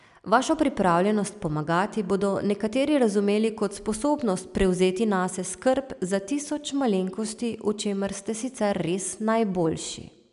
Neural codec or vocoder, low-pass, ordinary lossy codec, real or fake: none; 10.8 kHz; none; real